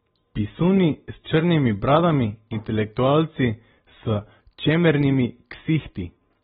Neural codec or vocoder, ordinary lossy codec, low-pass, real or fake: none; AAC, 16 kbps; 9.9 kHz; real